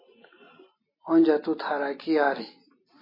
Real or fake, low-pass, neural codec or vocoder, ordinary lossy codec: real; 5.4 kHz; none; MP3, 24 kbps